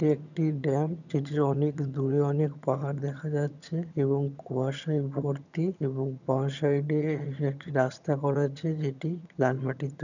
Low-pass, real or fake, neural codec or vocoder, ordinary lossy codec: 7.2 kHz; fake; vocoder, 22.05 kHz, 80 mel bands, HiFi-GAN; none